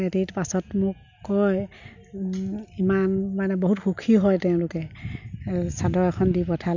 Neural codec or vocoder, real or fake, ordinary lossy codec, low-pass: none; real; none; 7.2 kHz